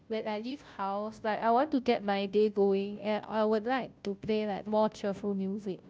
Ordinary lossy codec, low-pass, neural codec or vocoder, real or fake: none; none; codec, 16 kHz, 0.5 kbps, FunCodec, trained on Chinese and English, 25 frames a second; fake